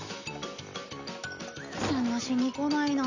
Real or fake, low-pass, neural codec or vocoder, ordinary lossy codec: real; 7.2 kHz; none; none